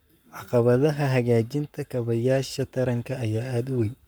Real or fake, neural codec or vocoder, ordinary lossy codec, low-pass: fake; codec, 44.1 kHz, 7.8 kbps, Pupu-Codec; none; none